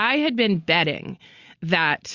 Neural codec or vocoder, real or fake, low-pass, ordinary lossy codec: none; real; 7.2 kHz; Opus, 64 kbps